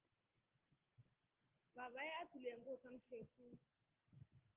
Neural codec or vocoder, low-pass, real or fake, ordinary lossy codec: none; 3.6 kHz; real; Opus, 16 kbps